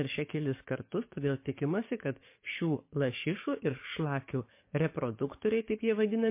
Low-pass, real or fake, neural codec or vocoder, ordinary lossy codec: 3.6 kHz; fake; codec, 44.1 kHz, 7.8 kbps, DAC; MP3, 24 kbps